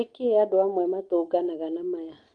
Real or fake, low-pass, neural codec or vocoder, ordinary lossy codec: real; 9.9 kHz; none; Opus, 24 kbps